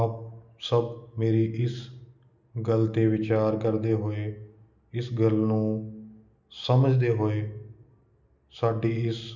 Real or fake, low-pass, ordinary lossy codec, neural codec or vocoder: real; 7.2 kHz; none; none